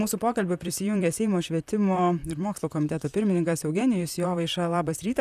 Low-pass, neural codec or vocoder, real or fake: 14.4 kHz; vocoder, 44.1 kHz, 128 mel bands, Pupu-Vocoder; fake